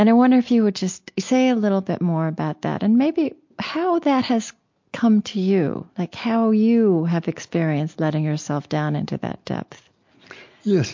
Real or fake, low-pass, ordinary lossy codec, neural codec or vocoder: real; 7.2 kHz; MP3, 48 kbps; none